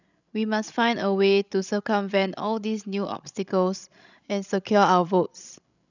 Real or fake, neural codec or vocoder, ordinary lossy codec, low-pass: fake; codec, 16 kHz, 16 kbps, FreqCodec, larger model; none; 7.2 kHz